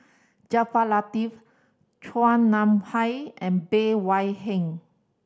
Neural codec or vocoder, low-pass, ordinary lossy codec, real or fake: none; none; none; real